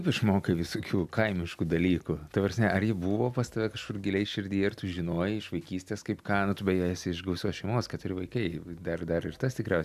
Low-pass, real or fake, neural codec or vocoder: 14.4 kHz; real; none